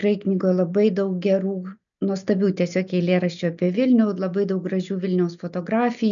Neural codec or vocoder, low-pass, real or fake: none; 7.2 kHz; real